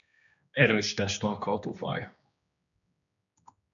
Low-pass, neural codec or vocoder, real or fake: 7.2 kHz; codec, 16 kHz, 2 kbps, X-Codec, HuBERT features, trained on general audio; fake